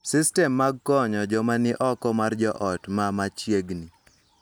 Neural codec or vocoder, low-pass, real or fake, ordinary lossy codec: none; none; real; none